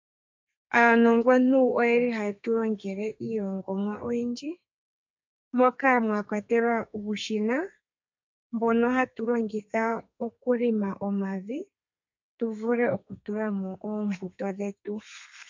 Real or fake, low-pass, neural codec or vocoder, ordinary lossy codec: fake; 7.2 kHz; codec, 32 kHz, 1.9 kbps, SNAC; MP3, 48 kbps